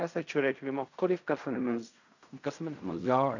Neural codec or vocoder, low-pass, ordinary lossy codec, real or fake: codec, 16 kHz in and 24 kHz out, 0.4 kbps, LongCat-Audio-Codec, fine tuned four codebook decoder; 7.2 kHz; none; fake